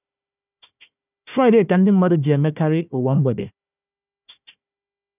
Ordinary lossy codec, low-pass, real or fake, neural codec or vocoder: none; 3.6 kHz; fake; codec, 16 kHz, 1 kbps, FunCodec, trained on Chinese and English, 50 frames a second